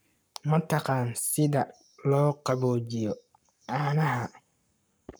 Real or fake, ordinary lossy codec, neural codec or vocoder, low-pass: fake; none; codec, 44.1 kHz, 7.8 kbps, Pupu-Codec; none